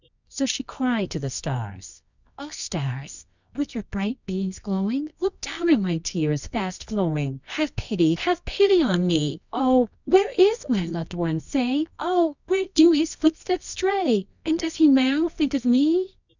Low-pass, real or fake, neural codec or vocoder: 7.2 kHz; fake; codec, 24 kHz, 0.9 kbps, WavTokenizer, medium music audio release